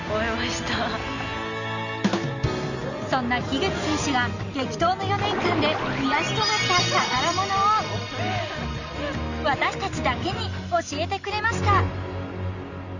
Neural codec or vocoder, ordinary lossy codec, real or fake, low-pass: none; Opus, 64 kbps; real; 7.2 kHz